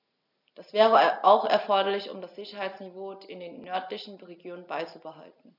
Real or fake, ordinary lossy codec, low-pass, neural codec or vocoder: real; none; 5.4 kHz; none